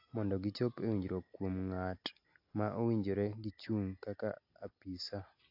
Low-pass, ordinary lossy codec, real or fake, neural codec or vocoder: 5.4 kHz; AAC, 32 kbps; real; none